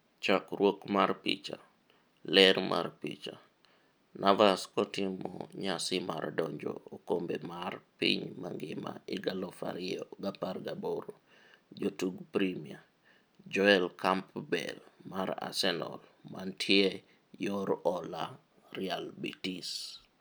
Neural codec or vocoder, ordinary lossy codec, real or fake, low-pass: none; none; real; none